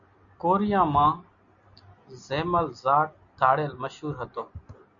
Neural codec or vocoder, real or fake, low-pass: none; real; 7.2 kHz